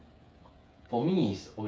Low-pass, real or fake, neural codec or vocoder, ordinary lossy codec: none; fake; codec, 16 kHz, 8 kbps, FreqCodec, smaller model; none